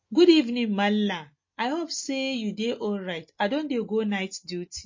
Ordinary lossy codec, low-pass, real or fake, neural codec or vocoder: MP3, 32 kbps; 7.2 kHz; real; none